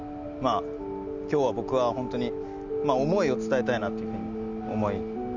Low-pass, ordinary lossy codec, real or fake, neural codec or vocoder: 7.2 kHz; none; real; none